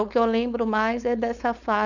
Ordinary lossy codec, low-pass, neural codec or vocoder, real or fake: none; 7.2 kHz; codec, 16 kHz, 4.8 kbps, FACodec; fake